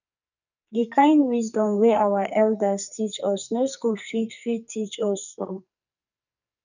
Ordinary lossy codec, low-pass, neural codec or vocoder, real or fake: none; 7.2 kHz; codec, 44.1 kHz, 2.6 kbps, SNAC; fake